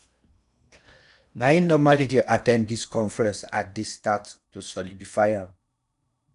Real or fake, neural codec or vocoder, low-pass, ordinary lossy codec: fake; codec, 16 kHz in and 24 kHz out, 0.8 kbps, FocalCodec, streaming, 65536 codes; 10.8 kHz; none